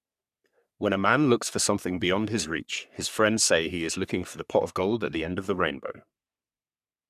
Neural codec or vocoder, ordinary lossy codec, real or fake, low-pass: codec, 44.1 kHz, 3.4 kbps, Pupu-Codec; none; fake; 14.4 kHz